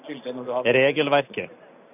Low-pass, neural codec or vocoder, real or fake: 3.6 kHz; none; real